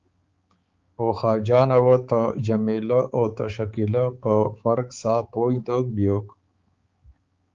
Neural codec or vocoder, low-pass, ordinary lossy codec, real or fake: codec, 16 kHz, 4 kbps, X-Codec, HuBERT features, trained on balanced general audio; 7.2 kHz; Opus, 32 kbps; fake